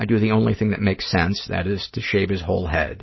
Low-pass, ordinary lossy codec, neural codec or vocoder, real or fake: 7.2 kHz; MP3, 24 kbps; none; real